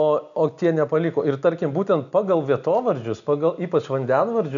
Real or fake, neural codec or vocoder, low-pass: real; none; 7.2 kHz